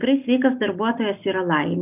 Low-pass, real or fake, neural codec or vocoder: 3.6 kHz; real; none